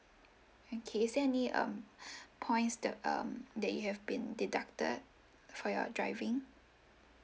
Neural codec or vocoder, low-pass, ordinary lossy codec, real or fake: none; none; none; real